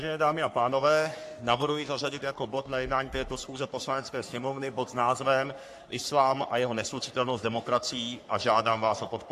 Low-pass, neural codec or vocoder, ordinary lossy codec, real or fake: 14.4 kHz; codec, 44.1 kHz, 3.4 kbps, Pupu-Codec; AAC, 64 kbps; fake